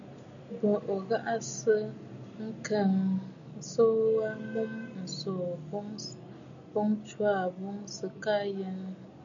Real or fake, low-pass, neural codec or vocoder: real; 7.2 kHz; none